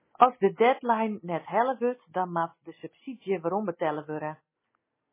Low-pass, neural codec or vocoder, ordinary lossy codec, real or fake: 3.6 kHz; none; MP3, 16 kbps; real